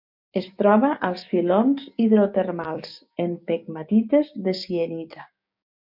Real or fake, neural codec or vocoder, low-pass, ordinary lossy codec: fake; codec, 16 kHz, 6 kbps, DAC; 5.4 kHz; MP3, 48 kbps